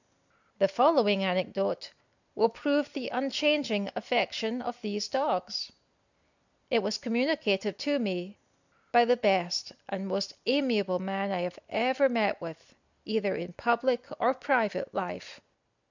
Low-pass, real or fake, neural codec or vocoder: 7.2 kHz; real; none